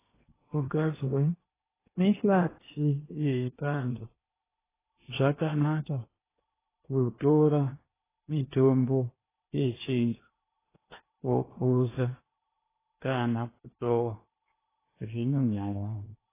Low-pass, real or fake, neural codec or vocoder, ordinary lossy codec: 3.6 kHz; fake; codec, 16 kHz in and 24 kHz out, 0.8 kbps, FocalCodec, streaming, 65536 codes; AAC, 16 kbps